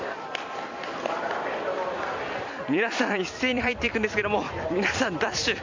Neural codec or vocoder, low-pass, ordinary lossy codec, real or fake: vocoder, 44.1 kHz, 128 mel bands every 256 samples, BigVGAN v2; 7.2 kHz; MP3, 48 kbps; fake